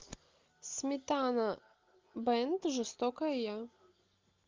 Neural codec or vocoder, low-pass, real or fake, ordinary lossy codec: none; 7.2 kHz; real; Opus, 32 kbps